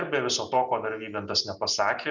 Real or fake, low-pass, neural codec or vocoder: real; 7.2 kHz; none